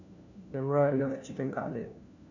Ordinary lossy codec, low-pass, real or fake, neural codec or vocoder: none; 7.2 kHz; fake; codec, 16 kHz, 1 kbps, FunCodec, trained on LibriTTS, 50 frames a second